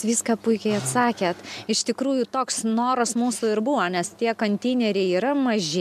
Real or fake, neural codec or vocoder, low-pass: real; none; 14.4 kHz